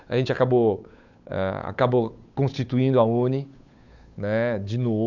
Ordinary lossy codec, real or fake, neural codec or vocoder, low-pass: none; fake; codec, 16 kHz, 8 kbps, FunCodec, trained on Chinese and English, 25 frames a second; 7.2 kHz